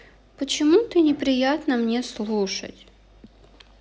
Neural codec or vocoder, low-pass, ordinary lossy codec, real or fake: none; none; none; real